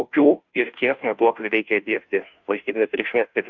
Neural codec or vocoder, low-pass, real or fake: codec, 16 kHz, 0.5 kbps, FunCodec, trained on Chinese and English, 25 frames a second; 7.2 kHz; fake